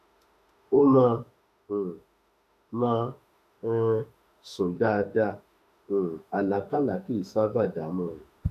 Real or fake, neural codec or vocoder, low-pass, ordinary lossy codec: fake; autoencoder, 48 kHz, 32 numbers a frame, DAC-VAE, trained on Japanese speech; 14.4 kHz; none